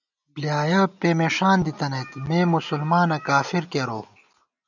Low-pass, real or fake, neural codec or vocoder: 7.2 kHz; real; none